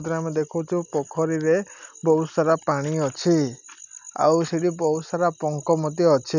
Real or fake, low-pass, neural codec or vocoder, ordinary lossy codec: real; 7.2 kHz; none; none